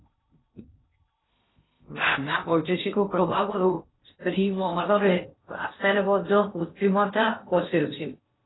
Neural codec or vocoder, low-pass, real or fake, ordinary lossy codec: codec, 16 kHz in and 24 kHz out, 0.6 kbps, FocalCodec, streaming, 2048 codes; 7.2 kHz; fake; AAC, 16 kbps